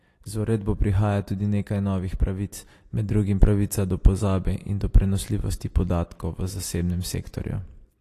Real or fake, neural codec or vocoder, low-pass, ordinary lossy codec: real; none; 14.4 kHz; AAC, 48 kbps